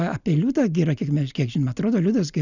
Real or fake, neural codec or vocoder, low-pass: real; none; 7.2 kHz